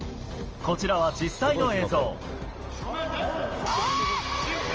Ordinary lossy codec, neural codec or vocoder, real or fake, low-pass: Opus, 24 kbps; none; real; 7.2 kHz